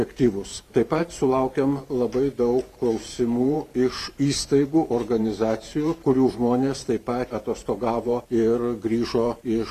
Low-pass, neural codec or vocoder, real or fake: 14.4 kHz; vocoder, 44.1 kHz, 128 mel bands every 512 samples, BigVGAN v2; fake